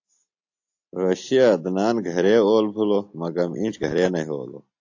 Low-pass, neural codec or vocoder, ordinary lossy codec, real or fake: 7.2 kHz; none; AAC, 48 kbps; real